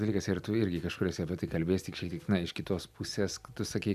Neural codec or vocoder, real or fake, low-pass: vocoder, 44.1 kHz, 128 mel bands every 256 samples, BigVGAN v2; fake; 14.4 kHz